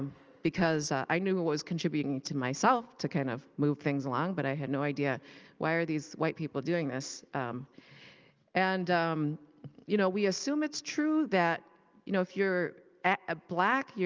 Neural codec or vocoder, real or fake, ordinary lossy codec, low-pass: none; real; Opus, 24 kbps; 7.2 kHz